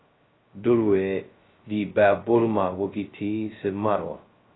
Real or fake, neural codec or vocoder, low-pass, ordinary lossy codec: fake; codec, 16 kHz, 0.2 kbps, FocalCodec; 7.2 kHz; AAC, 16 kbps